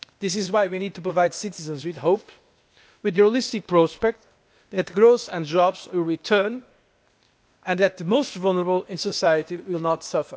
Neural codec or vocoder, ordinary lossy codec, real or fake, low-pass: codec, 16 kHz, 0.8 kbps, ZipCodec; none; fake; none